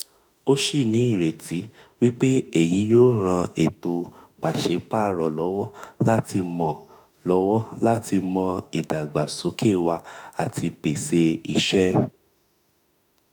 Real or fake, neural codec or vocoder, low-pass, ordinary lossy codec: fake; autoencoder, 48 kHz, 32 numbers a frame, DAC-VAE, trained on Japanese speech; none; none